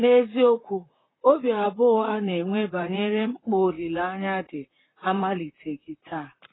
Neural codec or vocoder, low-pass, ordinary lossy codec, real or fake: vocoder, 44.1 kHz, 128 mel bands, Pupu-Vocoder; 7.2 kHz; AAC, 16 kbps; fake